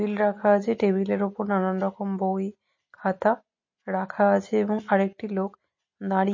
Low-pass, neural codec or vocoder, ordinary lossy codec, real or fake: 7.2 kHz; none; MP3, 32 kbps; real